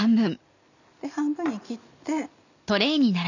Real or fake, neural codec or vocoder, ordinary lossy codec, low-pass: real; none; none; 7.2 kHz